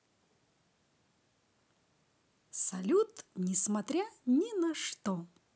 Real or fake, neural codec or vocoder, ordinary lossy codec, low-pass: real; none; none; none